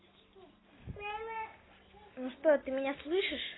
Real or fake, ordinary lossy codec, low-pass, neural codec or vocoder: real; AAC, 16 kbps; 7.2 kHz; none